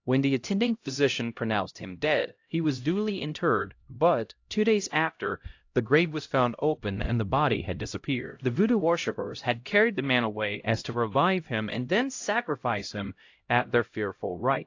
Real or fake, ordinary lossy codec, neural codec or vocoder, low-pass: fake; AAC, 48 kbps; codec, 16 kHz, 0.5 kbps, X-Codec, HuBERT features, trained on LibriSpeech; 7.2 kHz